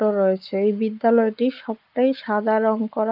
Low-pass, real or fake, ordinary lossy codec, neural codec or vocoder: 5.4 kHz; fake; Opus, 24 kbps; codec, 44.1 kHz, 7.8 kbps, Pupu-Codec